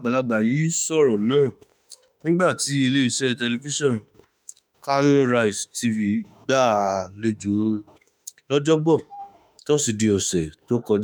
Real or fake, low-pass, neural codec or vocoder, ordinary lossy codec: fake; none; autoencoder, 48 kHz, 32 numbers a frame, DAC-VAE, trained on Japanese speech; none